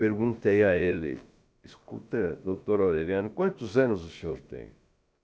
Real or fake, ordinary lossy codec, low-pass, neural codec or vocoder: fake; none; none; codec, 16 kHz, about 1 kbps, DyCAST, with the encoder's durations